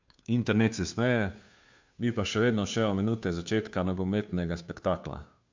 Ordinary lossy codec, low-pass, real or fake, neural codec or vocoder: MP3, 64 kbps; 7.2 kHz; fake; codec, 16 kHz, 2 kbps, FunCodec, trained on Chinese and English, 25 frames a second